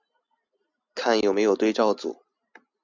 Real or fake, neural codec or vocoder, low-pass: real; none; 7.2 kHz